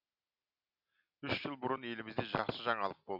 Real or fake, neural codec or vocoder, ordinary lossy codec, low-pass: real; none; none; 5.4 kHz